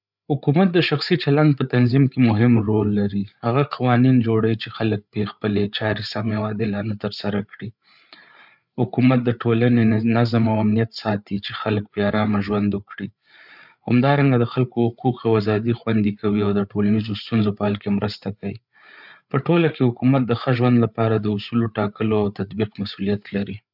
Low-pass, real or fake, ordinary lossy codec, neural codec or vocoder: 5.4 kHz; fake; none; codec, 16 kHz, 8 kbps, FreqCodec, larger model